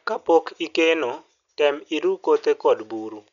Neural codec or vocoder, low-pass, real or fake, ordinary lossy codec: none; 7.2 kHz; real; none